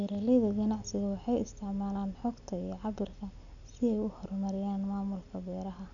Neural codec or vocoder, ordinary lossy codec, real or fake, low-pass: none; none; real; 7.2 kHz